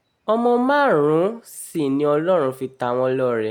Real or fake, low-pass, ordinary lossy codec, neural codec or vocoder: real; none; none; none